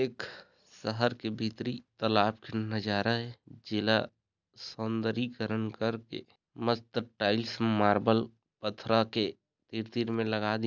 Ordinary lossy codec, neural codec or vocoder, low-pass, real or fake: none; none; 7.2 kHz; real